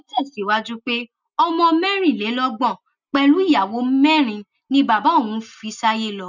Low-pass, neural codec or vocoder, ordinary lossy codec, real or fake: 7.2 kHz; none; none; real